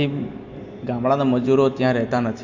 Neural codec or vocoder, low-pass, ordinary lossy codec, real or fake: none; 7.2 kHz; AAC, 48 kbps; real